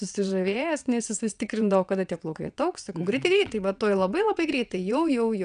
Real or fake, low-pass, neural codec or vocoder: fake; 9.9 kHz; vocoder, 22.05 kHz, 80 mel bands, WaveNeXt